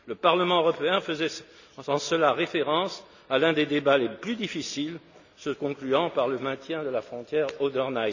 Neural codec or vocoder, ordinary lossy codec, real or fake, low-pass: none; none; real; 7.2 kHz